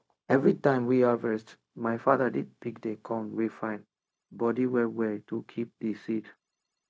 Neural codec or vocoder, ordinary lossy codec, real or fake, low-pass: codec, 16 kHz, 0.4 kbps, LongCat-Audio-Codec; none; fake; none